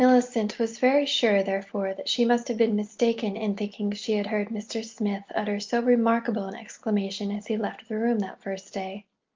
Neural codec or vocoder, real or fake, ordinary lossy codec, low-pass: none; real; Opus, 24 kbps; 7.2 kHz